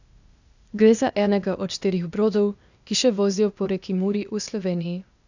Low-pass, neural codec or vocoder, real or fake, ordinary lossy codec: 7.2 kHz; codec, 16 kHz, 0.8 kbps, ZipCodec; fake; none